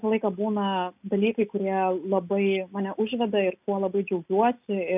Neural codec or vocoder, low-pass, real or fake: none; 3.6 kHz; real